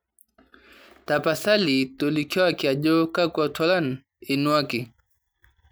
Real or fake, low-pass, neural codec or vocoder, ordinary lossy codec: real; none; none; none